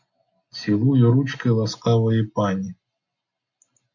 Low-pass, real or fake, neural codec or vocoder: 7.2 kHz; real; none